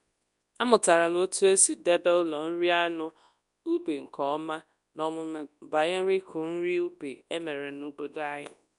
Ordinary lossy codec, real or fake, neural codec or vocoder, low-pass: none; fake; codec, 24 kHz, 0.9 kbps, WavTokenizer, large speech release; 10.8 kHz